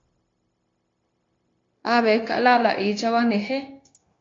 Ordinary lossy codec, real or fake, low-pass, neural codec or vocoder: AAC, 32 kbps; fake; 7.2 kHz; codec, 16 kHz, 0.9 kbps, LongCat-Audio-Codec